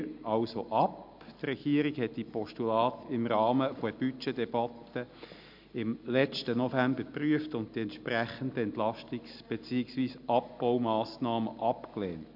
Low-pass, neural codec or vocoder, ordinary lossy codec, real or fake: 5.4 kHz; none; MP3, 48 kbps; real